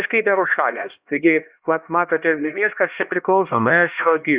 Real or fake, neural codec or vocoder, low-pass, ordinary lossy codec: fake; codec, 16 kHz, 1 kbps, X-Codec, HuBERT features, trained on LibriSpeech; 3.6 kHz; Opus, 64 kbps